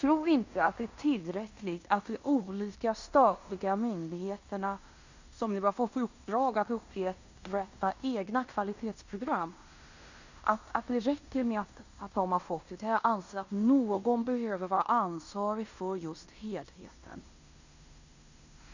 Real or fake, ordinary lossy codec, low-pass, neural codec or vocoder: fake; Opus, 64 kbps; 7.2 kHz; codec, 16 kHz in and 24 kHz out, 0.9 kbps, LongCat-Audio-Codec, fine tuned four codebook decoder